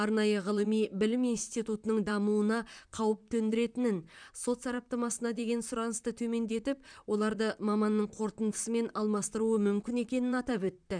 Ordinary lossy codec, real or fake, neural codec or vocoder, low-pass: none; fake; vocoder, 44.1 kHz, 128 mel bands, Pupu-Vocoder; 9.9 kHz